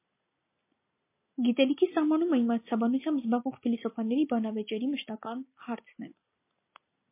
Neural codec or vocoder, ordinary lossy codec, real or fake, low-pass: none; MP3, 24 kbps; real; 3.6 kHz